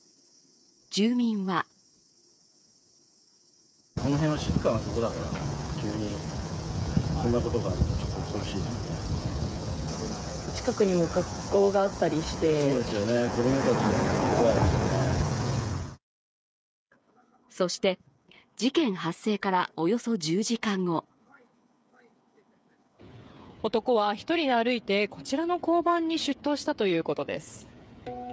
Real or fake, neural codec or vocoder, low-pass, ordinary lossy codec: fake; codec, 16 kHz, 8 kbps, FreqCodec, smaller model; none; none